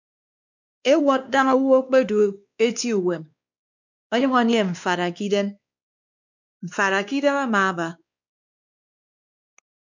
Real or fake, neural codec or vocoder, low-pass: fake; codec, 16 kHz, 2 kbps, X-Codec, WavLM features, trained on Multilingual LibriSpeech; 7.2 kHz